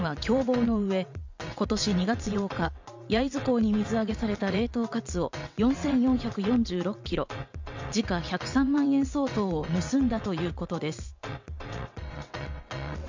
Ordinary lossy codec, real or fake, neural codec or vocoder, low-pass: MP3, 64 kbps; fake; vocoder, 22.05 kHz, 80 mel bands, WaveNeXt; 7.2 kHz